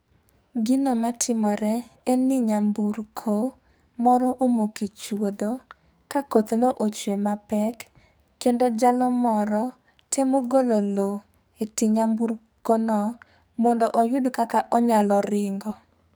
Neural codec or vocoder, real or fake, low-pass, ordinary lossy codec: codec, 44.1 kHz, 2.6 kbps, SNAC; fake; none; none